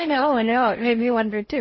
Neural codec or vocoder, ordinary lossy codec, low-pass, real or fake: codec, 16 kHz in and 24 kHz out, 0.6 kbps, FocalCodec, streaming, 4096 codes; MP3, 24 kbps; 7.2 kHz; fake